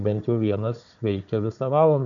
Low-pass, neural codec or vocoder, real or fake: 7.2 kHz; codec, 16 kHz, 4 kbps, FunCodec, trained on Chinese and English, 50 frames a second; fake